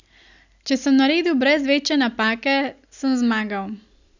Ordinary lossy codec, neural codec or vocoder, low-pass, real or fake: none; none; 7.2 kHz; real